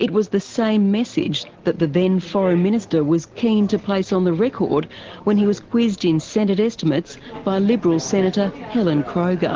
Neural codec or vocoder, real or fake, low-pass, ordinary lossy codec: none; real; 7.2 kHz; Opus, 16 kbps